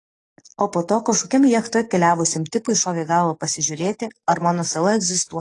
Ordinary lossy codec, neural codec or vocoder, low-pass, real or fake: AAC, 32 kbps; codec, 44.1 kHz, 7.8 kbps, DAC; 10.8 kHz; fake